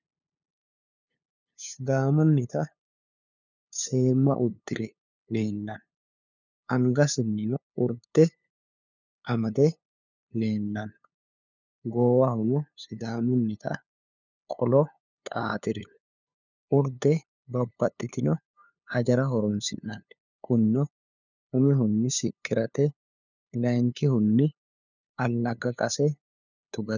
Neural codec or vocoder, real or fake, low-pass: codec, 16 kHz, 2 kbps, FunCodec, trained on LibriTTS, 25 frames a second; fake; 7.2 kHz